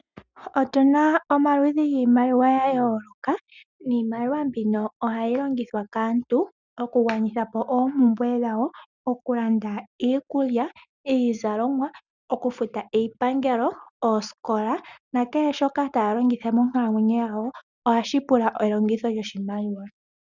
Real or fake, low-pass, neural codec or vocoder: real; 7.2 kHz; none